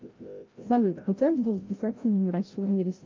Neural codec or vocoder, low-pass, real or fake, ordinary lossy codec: codec, 16 kHz, 0.5 kbps, FreqCodec, larger model; 7.2 kHz; fake; Opus, 32 kbps